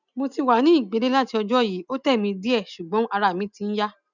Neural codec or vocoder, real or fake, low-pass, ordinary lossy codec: none; real; 7.2 kHz; none